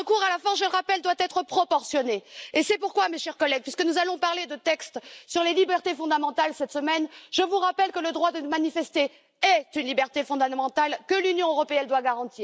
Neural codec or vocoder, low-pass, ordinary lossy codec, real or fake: none; none; none; real